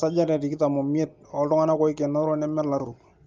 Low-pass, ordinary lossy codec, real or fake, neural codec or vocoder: 7.2 kHz; Opus, 32 kbps; real; none